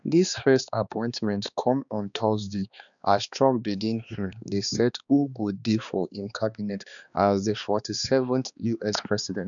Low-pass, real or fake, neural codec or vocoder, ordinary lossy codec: 7.2 kHz; fake; codec, 16 kHz, 2 kbps, X-Codec, HuBERT features, trained on balanced general audio; none